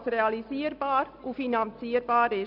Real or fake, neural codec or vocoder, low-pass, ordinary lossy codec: real; none; 5.4 kHz; none